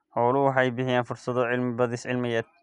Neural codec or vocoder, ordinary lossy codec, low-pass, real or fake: none; none; 10.8 kHz; real